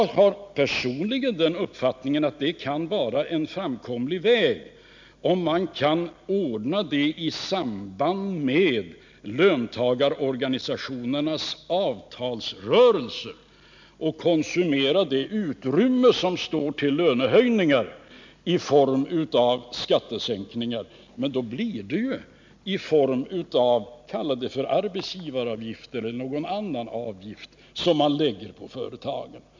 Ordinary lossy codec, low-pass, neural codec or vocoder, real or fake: MP3, 64 kbps; 7.2 kHz; none; real